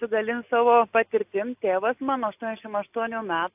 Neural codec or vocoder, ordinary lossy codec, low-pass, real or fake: none; AAC, 32 kbps; 3.6 kHz; real